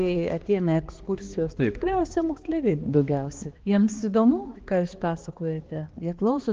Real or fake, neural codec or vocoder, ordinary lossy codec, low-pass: fake; codec, 16 kHz, 2 kbps, X-Codec, HuBERT features, trained on balanced general audio; Opus, 16 kbps; 7.2 kHz